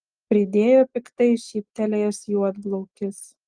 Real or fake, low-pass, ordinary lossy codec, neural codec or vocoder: real; 9.9 kHz; Opus, 24 kbps; none